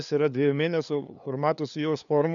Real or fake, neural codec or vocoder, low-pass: fake; codec, 16 kHz, 2 kbps, FunCodec, trained on LibriTTS, 25 frames a second; 7.2 kHz